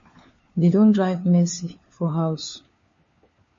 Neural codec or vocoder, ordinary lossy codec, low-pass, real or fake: codec, 16 kHz, 4 kbps, FunCodec, trained on LibriTTS, 50 frames a second; MP3, 32 kbps; 7.2 kHz; fake